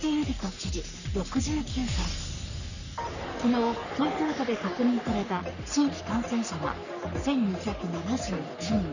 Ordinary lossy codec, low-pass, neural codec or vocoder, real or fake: none; 7.2 kHz; codec, 44.1 kHz, 3.4 kbps, Pupu-Codec; fake